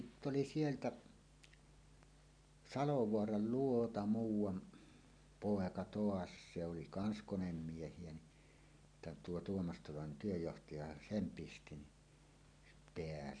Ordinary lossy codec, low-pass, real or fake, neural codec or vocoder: none; 9.9 kHz; real; none